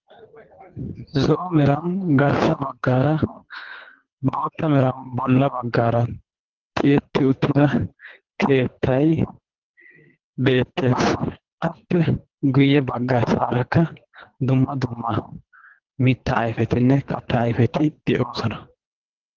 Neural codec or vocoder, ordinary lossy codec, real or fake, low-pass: codec, 24 kHz, 3 kbps, HILCodec; Opus, 16 kbps; fake; 7.2 kHz